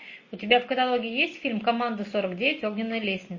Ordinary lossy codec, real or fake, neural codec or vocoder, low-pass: MP3, 32 kbps; real; none; 7.2 kHz